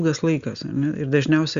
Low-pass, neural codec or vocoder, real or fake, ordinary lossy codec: 7.2 kHz; none; real; Opus, 64 kbps